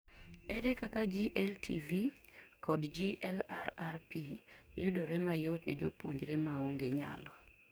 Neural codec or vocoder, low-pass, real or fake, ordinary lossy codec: codec, 44.1 kHz, 2.6 kbps, DAC; none; fake; none